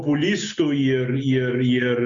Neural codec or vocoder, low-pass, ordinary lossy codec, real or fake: none; 7.2 kHz; MP3, 64 kbps; real